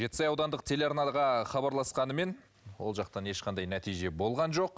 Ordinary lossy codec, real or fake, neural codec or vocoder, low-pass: none; real; none; none